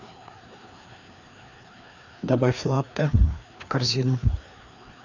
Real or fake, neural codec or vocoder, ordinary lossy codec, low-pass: fake; codec, 16 kHz, 2 kbps, FreqCodec, larger model; none; 7.2 kHz